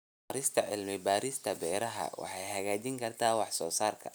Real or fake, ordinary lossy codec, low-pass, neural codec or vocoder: real; none; none; none